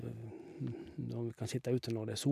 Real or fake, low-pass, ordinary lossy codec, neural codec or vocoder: real; 14.4 kHz; none; none